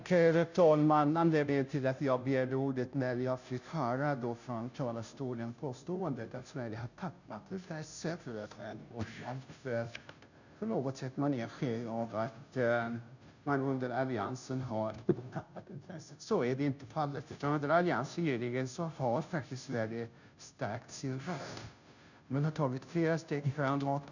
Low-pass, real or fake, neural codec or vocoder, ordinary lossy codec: 7.2 kHz; fake; codec, 16 kHz, 0.5 kbps, FunCodec, trained on Chinese and English, 25 frames a second; none